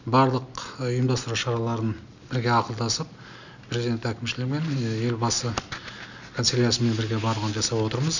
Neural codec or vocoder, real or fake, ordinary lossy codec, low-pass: none; real; none; 7.2 kHz